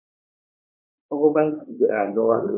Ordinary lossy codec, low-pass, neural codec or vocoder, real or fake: MP3, 32 kbps; 3.6 kHz; codec, 16 kHz, 4 kbps, X-Codec, WavLM features, trained on Multilingual LibriSpeech; fake